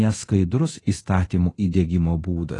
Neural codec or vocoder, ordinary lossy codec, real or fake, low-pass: codec, 24 kHz, 0.9 kbps, DualCodec; AAC, 32 kbps; fake; 10.8 kHz